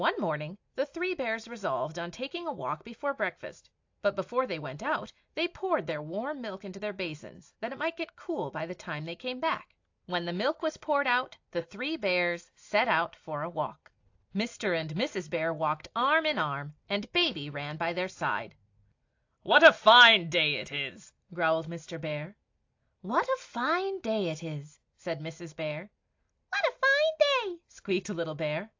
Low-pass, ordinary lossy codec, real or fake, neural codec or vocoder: 7.2 kHz; AAC, 48 kbps; real; none